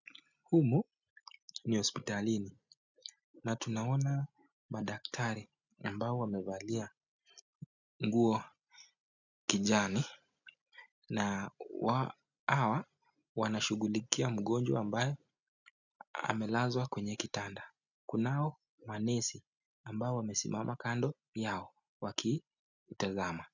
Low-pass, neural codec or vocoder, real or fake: 7.2 kHz; none; real